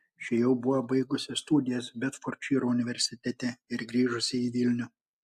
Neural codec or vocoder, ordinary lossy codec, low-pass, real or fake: none; AAC, 96 kbps; 14.4 kHz; real